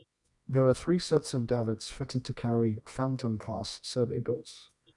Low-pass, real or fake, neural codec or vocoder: 10.8 kHz; fake; codec, 24 kHz, 0.9 kbps, WavTokenizer, medium music audio release